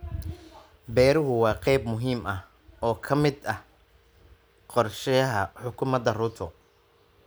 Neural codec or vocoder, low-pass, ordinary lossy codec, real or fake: none; none; none; real